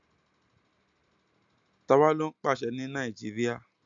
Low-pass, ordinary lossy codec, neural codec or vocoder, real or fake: 7.2 kHz; AAC, 64 kbps; none; real